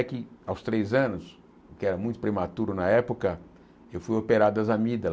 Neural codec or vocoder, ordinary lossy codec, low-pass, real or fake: none; none; none; real